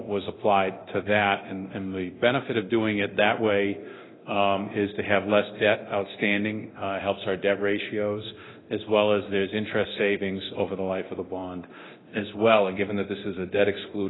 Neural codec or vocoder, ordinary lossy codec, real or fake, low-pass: codec, 24 kHz, 0.9 kbps, DualCodec; AAC, 16 kbps; fake; 7.2 kHz